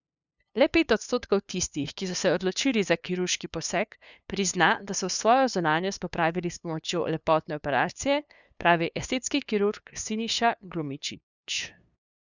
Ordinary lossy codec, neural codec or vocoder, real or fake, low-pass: none; codec, 16 kHz, 2 kbps, FunCodec, trained on LibriTTS, 25 frames a second; fake; 7.2 kHz